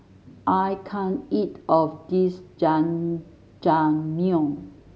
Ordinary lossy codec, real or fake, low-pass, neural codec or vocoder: none; real; none; none